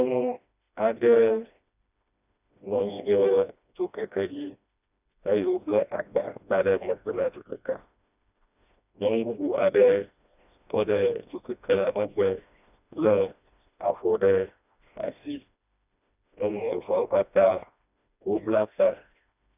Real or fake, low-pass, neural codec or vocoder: fake; 3.6 kHz; codec, 16 kHz, 1 kbps, FreqCodec, smaller model